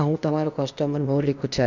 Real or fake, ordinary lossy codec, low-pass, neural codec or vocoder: fake; none; 7.2 kHz; codec, 16 kHz, 0.8 kbps, ZipCodec